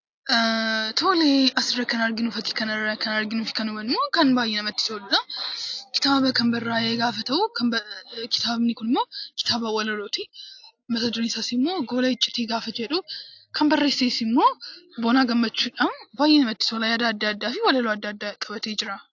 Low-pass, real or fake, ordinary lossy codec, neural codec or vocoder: 7.2 kHz; real; AAC, 48 kbps; none